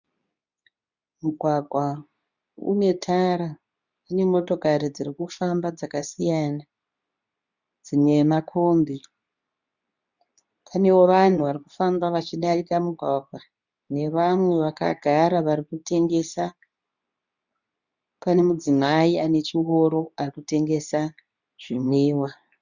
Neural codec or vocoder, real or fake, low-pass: codec, 24 kHz, 0.9 kbps, WavTokenizer, medium speech release version 2; fake; 7.2 kHz